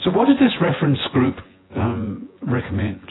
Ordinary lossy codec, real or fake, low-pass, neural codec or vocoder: AAC, 16 kbps; fake; 7.2 kHz; vocoder, 24 kHz, 100 mel bands, Vocos